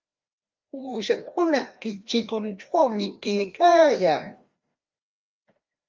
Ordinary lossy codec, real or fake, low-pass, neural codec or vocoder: Opus, 32 kbps; fake; 7.2 kHz; codec, 16 kHz, 1 kbps, FreqCodec, larger model